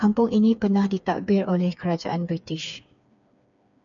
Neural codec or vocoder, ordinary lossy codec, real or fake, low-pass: codec, 16 kHz, 4 kbps, FreqCodec, smaller model; MP3, 96 kbps; fake; 7.2 kHz